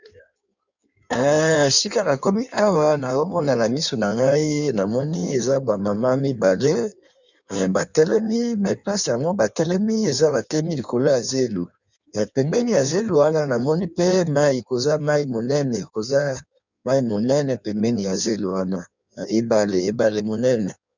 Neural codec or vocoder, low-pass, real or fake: codec, 16 kHz in and 24 kHz out, 1.1 kbps, FireRedTTS-2 codec; 7.2 kHz; fake